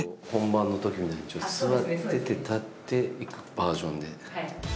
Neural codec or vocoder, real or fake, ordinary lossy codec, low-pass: none; real; none; none